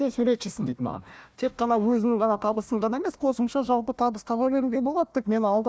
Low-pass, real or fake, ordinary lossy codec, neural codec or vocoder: none; fake; none; codec, 16 kHz, 1 kbps, FunCodec, trained on Chinese and English, 50 frames a second